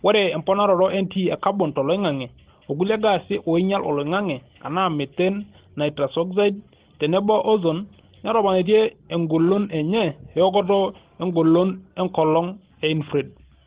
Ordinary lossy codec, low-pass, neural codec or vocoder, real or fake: Opus, 16 kbps; 3.6 kHz; none; real